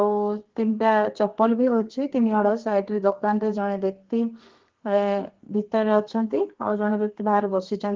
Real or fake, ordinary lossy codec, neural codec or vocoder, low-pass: fake; Opus, 16 kbps; codec, 32 kHz, 1.9 kbps, SNAC; 7.2 kHz